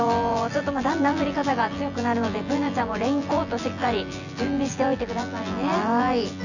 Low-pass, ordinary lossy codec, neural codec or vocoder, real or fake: 7.2 kHz; none; vocoder, 24 kHz, 100 mel bands, Vocos; fake